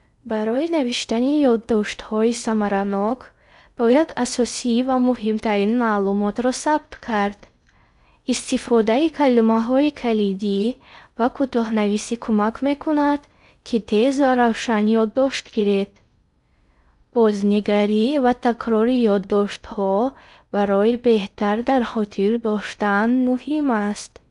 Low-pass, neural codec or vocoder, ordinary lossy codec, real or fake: 10.8 kHz; codec, 16 kHz in and 24 kHz out, 0.6 kbps, FocalCodec, streaming, 2048 codes; none; fake